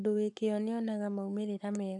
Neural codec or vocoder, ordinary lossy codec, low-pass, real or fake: codec, 44.1 kHz, 7.8 kbps, Pupu-Codec; AAC, 64 kbps; 10.8 kHz; fake